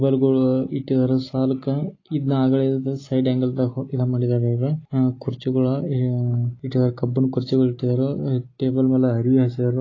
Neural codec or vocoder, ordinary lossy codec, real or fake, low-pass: none; AAC, 32 kbps; real; 7.2 kHz